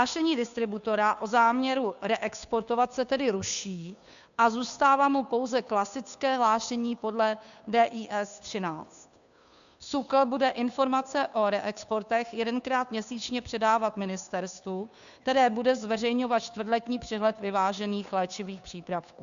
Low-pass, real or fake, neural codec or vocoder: 7.2 kHz; fake; codec, 16 kHz, 2 kbps, FunCodec, trained on Chinese and English, 25 frames a second